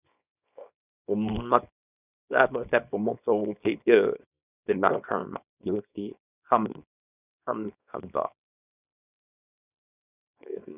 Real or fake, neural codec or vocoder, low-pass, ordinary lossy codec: fake; codec, 24 kHz, 0.9 kbps, WavTokenizer, small release; 3.6 kHz; none